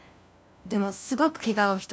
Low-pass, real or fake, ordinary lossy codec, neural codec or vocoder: none; fake; none; codec, 16 kHz, 1 kbps, FunCodec, trained on LibriTTS, 50 frames a second